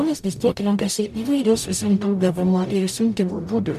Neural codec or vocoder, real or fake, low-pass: codec, 44.1 kHz, 0.9 kbps, DAC; fake; 14.4 kHz